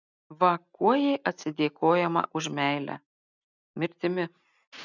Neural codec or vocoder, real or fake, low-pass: none; real; 7.2 kHz